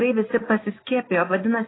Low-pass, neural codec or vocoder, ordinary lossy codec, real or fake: 7.2 kHz; none; AAC, 16 kbps; real